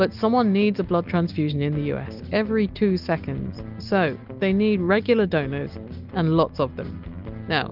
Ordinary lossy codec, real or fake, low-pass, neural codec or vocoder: Opus, 32 kbps; real; 5.4 kHz; none